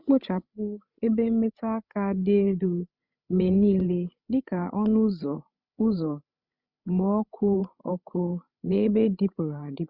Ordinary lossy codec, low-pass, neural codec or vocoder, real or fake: Opus, 64 kbps; 5.4 kHz; codec, 16 kHz, 16 kbps, FreqCodec, larger model; fake